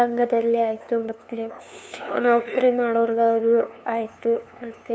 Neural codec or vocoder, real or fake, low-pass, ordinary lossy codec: codec, 16 kHz, 2 kbps, FunCodec, trained on LibriTTS, 25 frames a second; fake; none; none